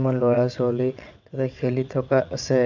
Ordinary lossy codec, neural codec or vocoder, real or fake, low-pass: MP3, 64 kbps; vocoder, 22.05 kHz, 80 mel bands, WaveNeXt; fake; 7.2 kHz